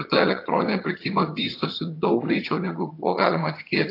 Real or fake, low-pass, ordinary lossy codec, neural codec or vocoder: fake; 5.4 kHz; AAC, 32 kbps; vocoder, 22.05 kHz, 80 mel bands, HiFi-GAN